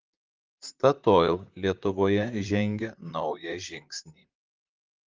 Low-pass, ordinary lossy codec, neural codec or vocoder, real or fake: 7.2 kHz; Opus, 24 kbps; vocoder, 44.1 kHz, 128 mel bands, Pupu-Vocoder; fake